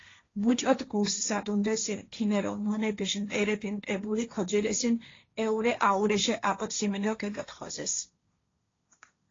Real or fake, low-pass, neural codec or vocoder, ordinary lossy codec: fake; 7.2 kHz; codec, 16 kHz, 1.1 kbps, Voila-Tokenizer; AAC, 32 kbps